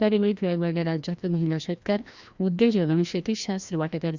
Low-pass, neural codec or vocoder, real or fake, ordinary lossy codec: 7.2 kHz; codec, 16 kHz, 1 kbps, FreqCodec, larger model; fake; Opus, 64 kbps